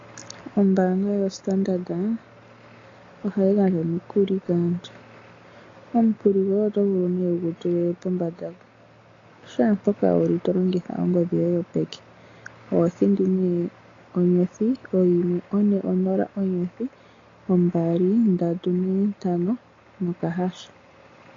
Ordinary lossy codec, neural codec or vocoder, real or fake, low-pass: AAC, 32 kbps; none; real; 7.2 kHz